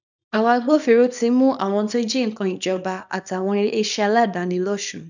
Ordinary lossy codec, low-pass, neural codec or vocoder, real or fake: none; 7.2 kHz; codec, 24 kHz, 0.9 kbps, WavTokenizer, small release; fake